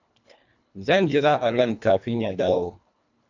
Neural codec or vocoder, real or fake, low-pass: codec, 24 kHz, 1.5 kbps, HILCodec; fake; 7.2 kHz